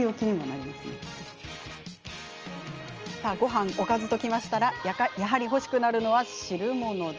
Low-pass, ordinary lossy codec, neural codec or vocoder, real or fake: 7.2 kHz; Opus, 24 kbps; none; real